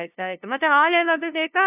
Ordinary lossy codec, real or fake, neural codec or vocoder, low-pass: AAC, 32 kbps; fake; codec, 16 kHz, 0.5 kbps, FunCodec, trained on LibriTTS, 25 frames a second; 3.6 kHz